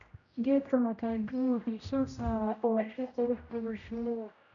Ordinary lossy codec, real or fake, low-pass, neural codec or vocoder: none; fake; 7.2 kHz; codec, 16 kHz, 0.5 kbps, X-Codec, HuBERT features, trained on general audio